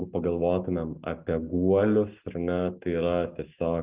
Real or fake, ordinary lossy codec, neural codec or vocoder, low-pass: real; Opus, 24 kbps; none; 3.6 kHz